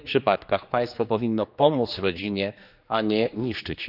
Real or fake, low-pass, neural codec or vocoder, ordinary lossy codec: fake; 5.4 kHz; codec, 16 kHz, 2 kbps, X-Codec, HuBERT features, trained on general audio; none